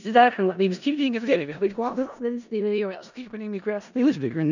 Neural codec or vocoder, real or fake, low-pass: codec, 16 kHz in and 24 kHz out, 0.4 kbps, LongCat-Audio-Codec, four codebook decoder; fake; 7.2 kHz